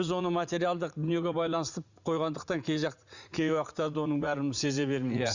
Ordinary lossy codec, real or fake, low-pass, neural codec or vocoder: Opus, 64 kbps; fake; 7.2 kHz; vocoder, 44.1 kHz, 80 mel bands, Vocos